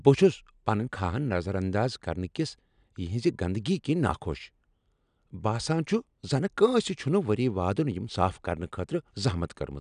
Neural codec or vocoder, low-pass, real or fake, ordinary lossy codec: none; 9.9 kHz; real; none